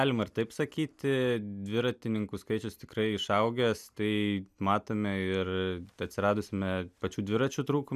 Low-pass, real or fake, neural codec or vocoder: 14.4 kHz; real; none